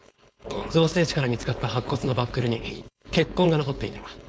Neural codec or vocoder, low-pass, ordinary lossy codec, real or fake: codec, 16 kHz, 4.8 kbps, FACodec; none; none; fake